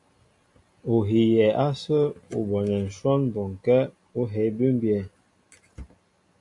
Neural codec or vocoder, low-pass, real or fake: none; 10.8 kHz; real